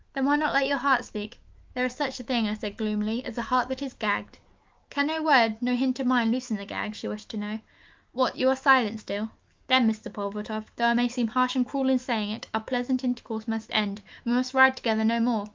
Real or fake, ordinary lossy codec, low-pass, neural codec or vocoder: fake; Opus, 24 kbps; 7.2 kHz; autoencoder, 48 kHz, 128 numbers a frame, DAC-VAE, trained on Japanese speech